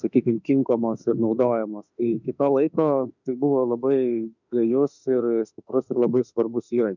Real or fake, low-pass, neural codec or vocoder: fake; 7.2 kHz; autoencoder, 48 kHz, 32 numbers a frame, DAC-VAE, trained on Japanese speech